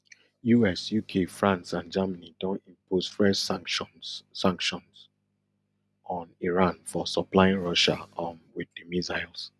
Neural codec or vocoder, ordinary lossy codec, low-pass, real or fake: none; none; none; real